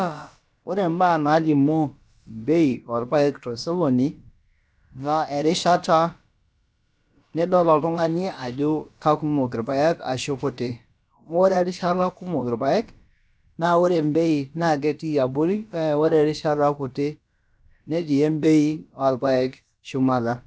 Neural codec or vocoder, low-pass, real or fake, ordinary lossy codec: codec, 16 kHz, about 1 kbps, DyCAST, with the encoder's durations; none; fake; none